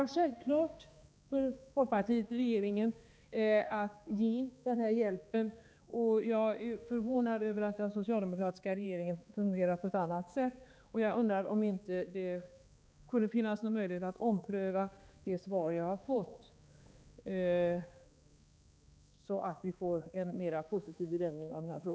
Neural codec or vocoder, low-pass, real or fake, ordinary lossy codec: codec, 16 kHz, 2 kbps, X-Codec, HuBERT features, trained on balanced general audio; none; fake; none